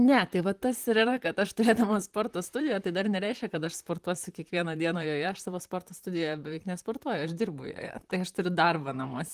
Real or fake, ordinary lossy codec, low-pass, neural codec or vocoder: fake; Opus, 24 kbps; 14.4 kHz; vocoder, 44.1 kHz, 128 mel bands, Pupu-Vocoder